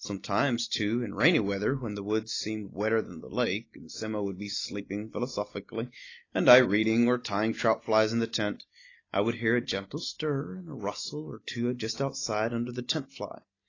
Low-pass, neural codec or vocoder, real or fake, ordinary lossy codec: 7.2 kHz; none; real; AAC, 32 kbps